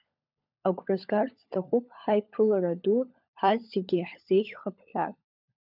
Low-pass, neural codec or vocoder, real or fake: 5.4 kHz; codec, 16 kHz, 16 kbps, FunCodec, trained on LibriTTS, 50 frames a second; fake